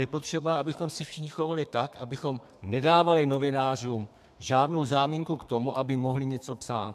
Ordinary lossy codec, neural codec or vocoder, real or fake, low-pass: MP3, 96 kbps; codec, 32 kHz, 1.9 kbps, SNAC; fake; 14.4 kHz